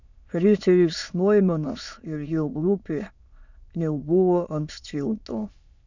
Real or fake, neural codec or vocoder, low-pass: fake; autoencoder, 22.05 kHz, a latent of 192 numbers a frame, VITS, trained on many speakers; 7.2 kHz